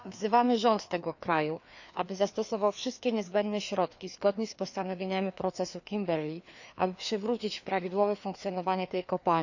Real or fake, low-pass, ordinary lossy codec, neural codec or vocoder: fake; 7.2 kHz; none; codec, 16 kHz, 2 kbps, FreqCodec, larger model